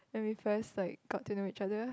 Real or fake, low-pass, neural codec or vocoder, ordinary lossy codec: real; none; none; none